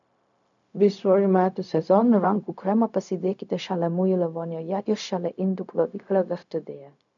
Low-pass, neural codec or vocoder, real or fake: 7.2 kHz; codec, 16 kHz, 0.4 kbps, LongCat-Audio-Codec; fake